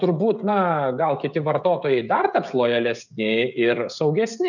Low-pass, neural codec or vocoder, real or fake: 7.2 kHz; codec, 16 kHz, 16 kbps, FreqCodec, smaller model; fake